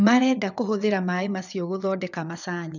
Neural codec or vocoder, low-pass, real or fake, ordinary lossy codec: vocoder, 22.05 kHz, 80 mel bands, WaveNeXt; 7.2 kHz; fake; none